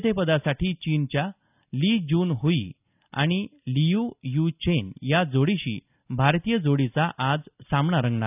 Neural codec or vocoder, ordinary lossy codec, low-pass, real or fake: none; none; 3.6 kHz; real